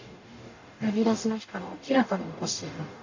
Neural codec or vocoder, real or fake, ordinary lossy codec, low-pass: codec, 44.1 kHz, 0.9 kbps, DAC; fake; AAC, 48 kbps; 7.2 kHz